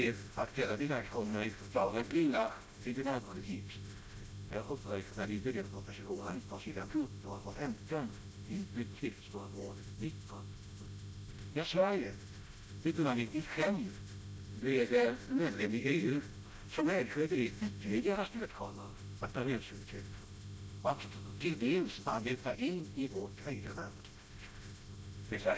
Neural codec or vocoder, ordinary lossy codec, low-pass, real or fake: codec, 16 kHz, 0.5 kbps, FreqCodec, smaller model; none; none; fake